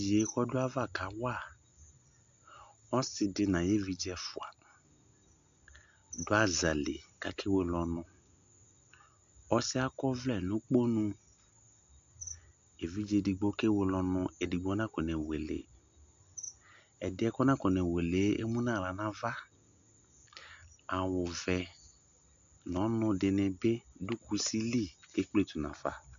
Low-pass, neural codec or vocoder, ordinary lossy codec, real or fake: 7.2 kHz; none; AAC, 64 kbps; real